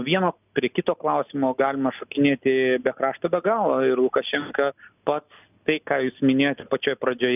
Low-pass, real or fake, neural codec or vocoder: 3.6 kHz; real; none